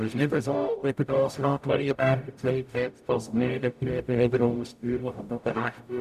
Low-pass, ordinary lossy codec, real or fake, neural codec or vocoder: 14.4 kHz; none; fake; codec, 44.1 kHz, 0.9 kbps, DAC